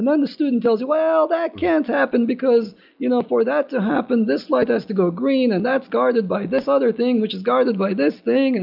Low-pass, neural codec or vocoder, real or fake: 5.4 kHz; none; real